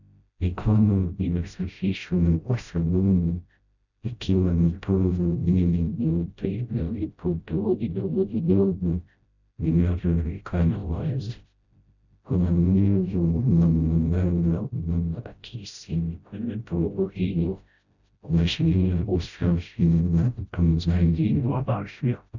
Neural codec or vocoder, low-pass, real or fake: codec, 16 kHz, 0.5 kbps, FreqCodec, smaller model; 7.2 kHz; fake